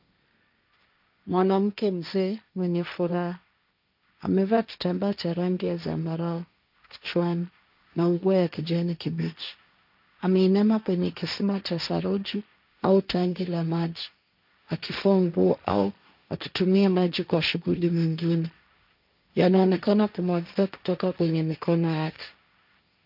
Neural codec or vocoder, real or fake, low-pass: codec, 16 kHz, 1.1 kbps, Voila-Tokenizer; fake; 5.4 kHz